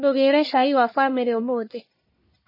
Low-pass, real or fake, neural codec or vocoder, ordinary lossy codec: 5.4 kHz; fake; codec, 44.1 kHz, 1.7 kbps, Pupu-Codec; MP3, 24 kbps